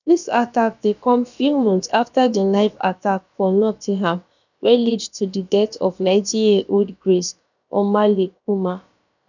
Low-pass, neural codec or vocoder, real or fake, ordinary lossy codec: 7.2 kHz; codec, 16 kHz, about 1 kbps, DyCAST, with the encoder's durations; fake; none